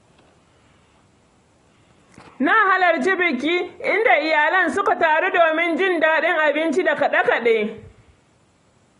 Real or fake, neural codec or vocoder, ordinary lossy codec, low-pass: real; none; AAC, 32 kbps; 10.8 kHz